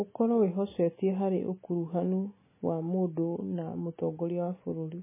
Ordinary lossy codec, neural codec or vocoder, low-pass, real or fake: MP3, 16 kbps; none; 3.6 kHz; real